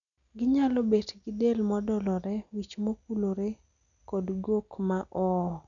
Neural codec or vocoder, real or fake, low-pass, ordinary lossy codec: none; real; 7.2 kHz; none